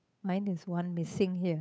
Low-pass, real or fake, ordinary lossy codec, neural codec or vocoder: none; fake; none; codec, 16 kHz, 8 kbps, FunCodec, trained on Chinese and English, 25 frames a second